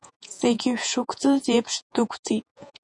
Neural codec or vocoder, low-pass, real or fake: vocoder, 48 kHz, 128 mel bands, Vocos; 10.8 kHz; fake